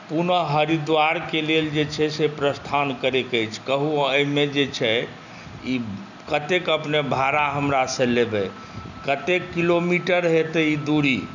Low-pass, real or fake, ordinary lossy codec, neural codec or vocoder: 7.2 kHz; real; none; none